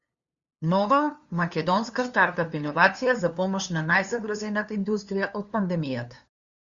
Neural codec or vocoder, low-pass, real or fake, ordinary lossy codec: codec, 16 kHz, 2 kbps, FunCodec, trained on LibriTTS, 25 frames a second; 7.2 kHz; fake; Opus, 64 kbps